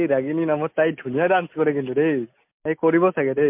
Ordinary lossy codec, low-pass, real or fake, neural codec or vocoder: MP3, 32 kbps; 3.6 kHz; real; none